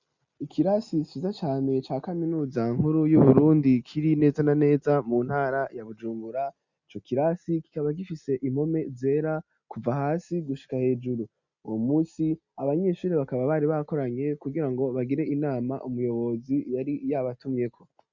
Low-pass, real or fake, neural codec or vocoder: 7.2 kHz; real; none